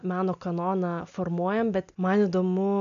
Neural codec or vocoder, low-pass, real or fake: none; 7.2 kHz; real